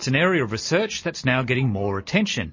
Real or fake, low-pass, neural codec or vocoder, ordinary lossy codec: real; 7.2 kHz; none; MP3, 32 kbps